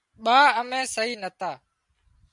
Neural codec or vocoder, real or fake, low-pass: none; real; 10.8 kHz